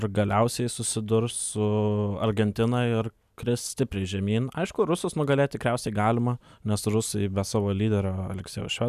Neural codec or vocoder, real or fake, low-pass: none; real; 14.4 kHz